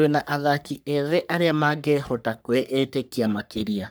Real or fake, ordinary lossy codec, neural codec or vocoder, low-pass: fake; none; codec, 44.1 kHz, 3.4 kbps, Pupu-Codec; none